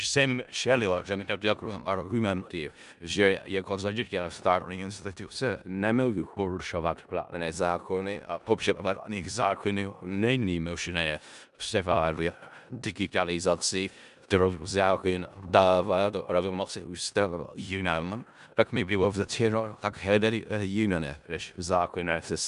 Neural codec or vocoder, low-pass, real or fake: codec, 16 kHz in and 24 kHz out, 0.4 kbps, LongCat-Audio-Codec, four codebook decoder; 10.8 kHz; fake